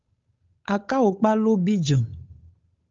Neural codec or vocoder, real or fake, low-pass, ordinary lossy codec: codec, 16 kHz, 8 kbps, FunCodec, trained on Chinese and English, 25 frames a second; fake; 7.2 kHz; Opus, 32 kbps